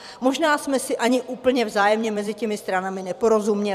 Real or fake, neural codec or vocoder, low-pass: fake; vocoder, 44.1 kHz, 128 mel bands every 256 samples, BigVGAN v2; 14.4 kHz